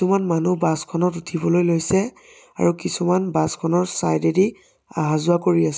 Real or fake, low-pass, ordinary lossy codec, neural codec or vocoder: real; none; none; none